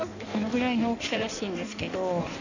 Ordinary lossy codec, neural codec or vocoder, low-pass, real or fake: none; codec, 16 kHz in and 24 kHz out, 1.1 kbps, FireRedTTS-2 codec; 7.2 kHz; fake